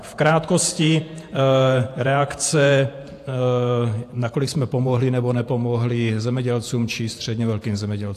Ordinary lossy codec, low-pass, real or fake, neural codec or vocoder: AAC, 64 kbps; 14.4 kHz; fake; vocoder, 48 kHz, 128 mel bands, Vocos